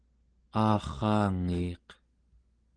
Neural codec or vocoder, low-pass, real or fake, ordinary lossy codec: none; 9.9 kHz; real; Opus, 16 kbps